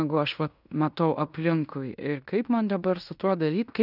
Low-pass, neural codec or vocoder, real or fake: 5.4 kHz; codec, 16 kHz in and 24 kHz out, 0.9 kbps, LongCat-Audio-Codec, fine tuned four codebook decoder; fake